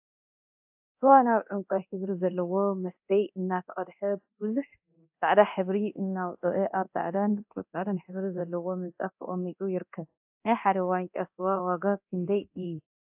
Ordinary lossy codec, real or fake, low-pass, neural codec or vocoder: AAC, 32 kbps; fake; 3.6 kHz; codec, 24 kHz, 0.9 kbps, DualCodec